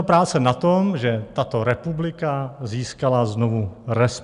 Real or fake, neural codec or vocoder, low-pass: real; none; 10.8 kHz